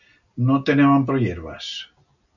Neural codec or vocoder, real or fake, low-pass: none; real; 7.2 kHz